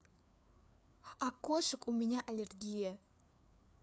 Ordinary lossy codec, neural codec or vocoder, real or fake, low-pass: none; codec, 16 kHz, 4 kbps, FreqCodec, larger model; fake; none